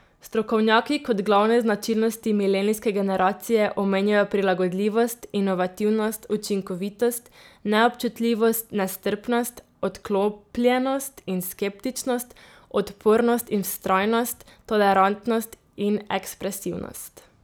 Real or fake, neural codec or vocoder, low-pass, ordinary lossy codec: real; none; none; none